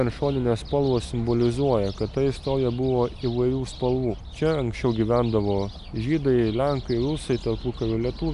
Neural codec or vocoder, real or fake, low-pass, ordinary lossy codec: none; real; 10.8 kHz; AAC, 96 kbps